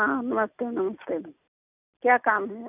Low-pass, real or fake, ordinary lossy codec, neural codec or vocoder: 3.6 kHz; real; none; none